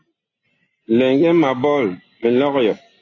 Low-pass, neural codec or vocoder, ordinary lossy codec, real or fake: 7.2 kHz; none; AAC, 32 kbps; real